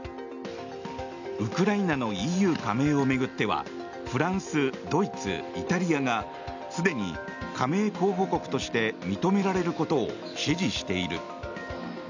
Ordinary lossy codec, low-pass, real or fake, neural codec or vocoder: none; 7.2 kHz; real; none